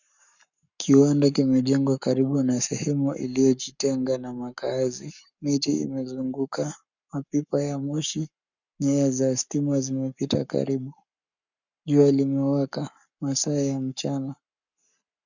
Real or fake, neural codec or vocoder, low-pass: fake; codec, 44.1 kHz, 7.8 kbps, Pupu-Codec; 7.2 kHz